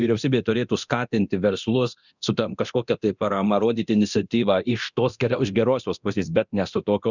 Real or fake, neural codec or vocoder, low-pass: fake; codec, 24 kHz, 0.9 kbps, DualCodec; 7.2 kHz